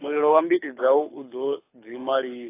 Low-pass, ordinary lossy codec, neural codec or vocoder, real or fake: 3.6 kHz; AAC, 24 kbps; codec, 24 kHz, 6 kbps, HILCodec; fake